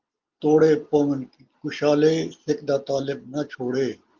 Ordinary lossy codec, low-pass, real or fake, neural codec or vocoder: Opus, 32 kbps; 7.2 kHz; real; none